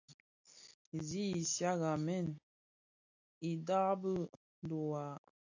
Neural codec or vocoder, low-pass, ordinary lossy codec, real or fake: none; 7.2 kHz; AAC, 48 kbps; real